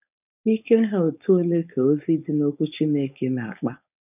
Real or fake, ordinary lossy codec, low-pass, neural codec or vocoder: fake; none; 3.6 kHz; codec, 16 kHz, 4.8 kbps, FACodec